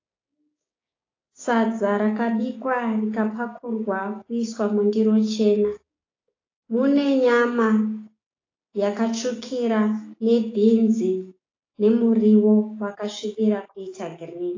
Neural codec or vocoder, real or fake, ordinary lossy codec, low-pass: codec, 16 kHz, 6 kbps, DAC; fake; AAC, 32 kbps; 7.2 kHz